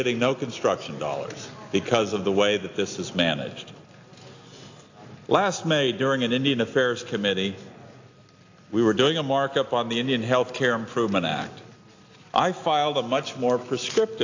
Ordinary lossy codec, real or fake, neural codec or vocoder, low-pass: AAC, 48 kbps; real; none; 7.2 kHz